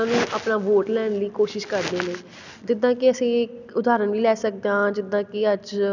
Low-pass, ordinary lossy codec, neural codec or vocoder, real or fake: 7.2 kHz; none; none; real